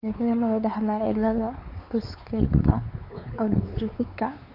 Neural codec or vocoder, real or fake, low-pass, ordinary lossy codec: codec, 16 kHz, 4 kbps, X-Codec, WavLM features, trained on Multilingual LibriSpeech; fake; 5.4 kHz; none